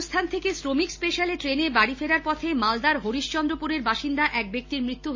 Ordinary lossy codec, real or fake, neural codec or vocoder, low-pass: MP3, 32 kbps; real; none; 7.2 kHz